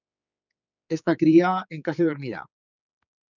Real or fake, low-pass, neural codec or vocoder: fake; 7.2 kHz; codec, 16 kHz, 4 kbps, X-Codec, HuBERT features, trained on general audio